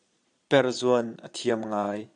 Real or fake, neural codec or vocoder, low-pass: fake; vocoder, 22.05 kHz, 80 mel bands, Vocos; 9.9 kHz